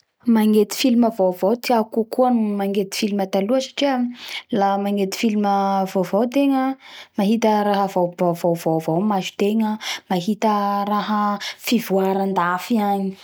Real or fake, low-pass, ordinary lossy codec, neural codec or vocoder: real; none; none; none